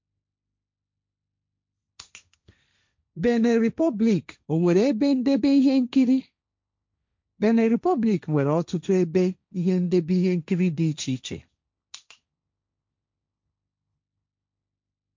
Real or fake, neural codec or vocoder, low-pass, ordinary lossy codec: fake; codec, 16 kHz, 1.1 kbps, Voila-Tokenizer; 7.2 kHz; MP3, 64 kbps